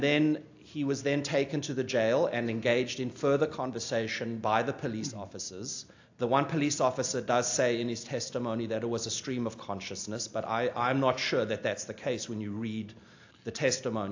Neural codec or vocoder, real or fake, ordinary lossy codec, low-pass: none; real; AAC, 48 kbps; 7.2 kHz